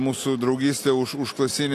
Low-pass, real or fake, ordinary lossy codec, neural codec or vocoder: 14.4 kHz; real; AAC, 48 kbps; none